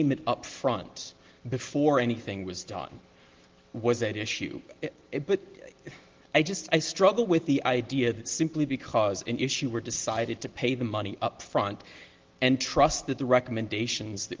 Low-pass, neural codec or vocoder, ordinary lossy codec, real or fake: 7.2 kHz; none; Opus, 16 kbps; real